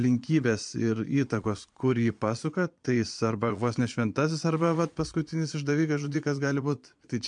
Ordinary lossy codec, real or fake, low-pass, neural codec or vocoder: MP3, 64 kbps; fake; 9.9 kHz; vocoder, 22.05 kHz, 80 mel bands, Vocos